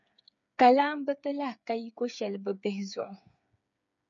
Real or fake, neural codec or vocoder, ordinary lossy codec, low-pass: fake; codec, 16 kHz, 8 kbps, FreqCodec, smaller model; MP3, 96 kbps; 7.2 kHz